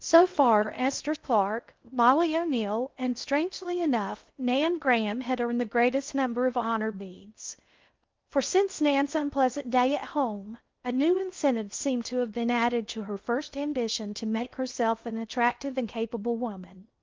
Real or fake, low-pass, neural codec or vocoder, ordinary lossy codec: fake; 7.2 kHz; codec, 16 kHz in and 24 kHz out, 0.6 kbps, FocalCodec, streaming, 2048 codes; Opus, 32 kbps